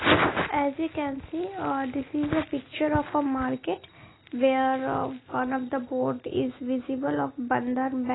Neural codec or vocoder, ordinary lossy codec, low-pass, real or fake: none; AAC, 16 kbps; 7.2 kHz; real